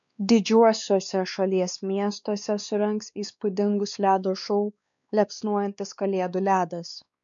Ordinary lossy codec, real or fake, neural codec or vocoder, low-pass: MP3, 96 kbps; fake; codec, 16 kHz, 2 kbps, X-Codec, WavLM features, trained on Multilingual LibriSpeech; 7.2 kHz